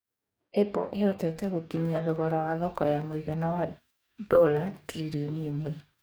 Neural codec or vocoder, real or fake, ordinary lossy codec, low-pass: codec, 44.1 kHz, 2.6 kbps, DAC; fake; none; none